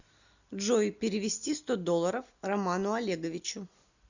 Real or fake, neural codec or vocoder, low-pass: real; none; 7.2 kHz